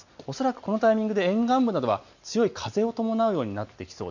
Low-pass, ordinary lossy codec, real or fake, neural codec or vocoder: 7.2 kHz; none; real; none